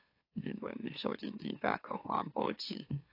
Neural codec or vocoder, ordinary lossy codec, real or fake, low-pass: autoencoder, 44.1 kHz, a latent of 192 numbers a frame, MeloTTS; AAC, 32 kbps; fake; 5.4 kHz